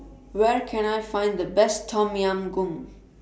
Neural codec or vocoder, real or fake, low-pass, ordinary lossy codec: none; real; none; none